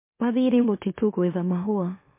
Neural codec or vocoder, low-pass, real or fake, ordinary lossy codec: autoencoder, 44.1 kHz, a latent of 192 numbers a frame, MeloTTS; 3.6 kHz; fake; MP3, 24 kbps